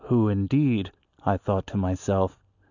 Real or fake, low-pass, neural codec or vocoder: real; 7.2 kHz; none